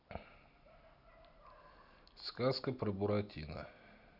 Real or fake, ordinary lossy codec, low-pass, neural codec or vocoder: real; none; 5.4 kHz; none